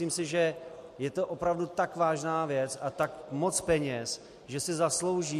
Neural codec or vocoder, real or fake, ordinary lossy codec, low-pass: none; real; MP3, 64 kbps; 14.4 kHz